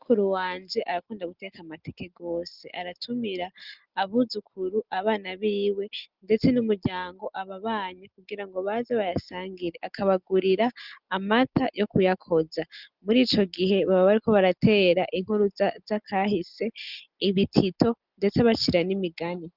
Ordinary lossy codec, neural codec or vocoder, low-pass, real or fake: Opus, 24 kbps; none; 5.4 kHz; real